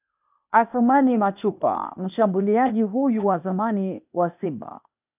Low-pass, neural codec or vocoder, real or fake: 3.6 kHz; codec, 16 kHz, 0.8 kbps, ZipCodec; fake